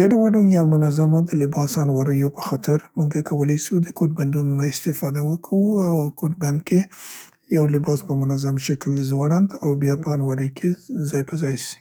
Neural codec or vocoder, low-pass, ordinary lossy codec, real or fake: codec, 44.1 kHz, 2.6 kbps, SNAC; none; none; fake